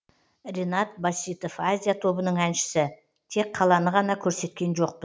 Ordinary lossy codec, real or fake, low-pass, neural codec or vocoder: none; real; none; none